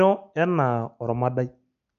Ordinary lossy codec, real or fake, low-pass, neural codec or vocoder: none; real; 7.2 kHz; none